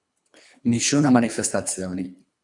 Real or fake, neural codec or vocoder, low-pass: fake; codec, 24 kHz, 3 kbps, HILCodec; 10.8 kHz